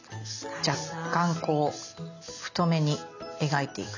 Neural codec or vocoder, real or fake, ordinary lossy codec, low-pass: none; real; none; 7.2 kHz